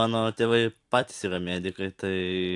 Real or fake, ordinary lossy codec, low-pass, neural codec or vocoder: fake; AAC, 64 kbps; 10.8 kHz; vocoder, 44.1 kHz, 128 mel bands every 256 samples, BigVGAN v2